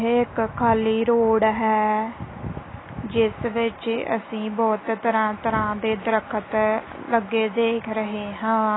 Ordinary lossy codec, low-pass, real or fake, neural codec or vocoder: AAC, 16 kbps; 7.2 kHz; real; none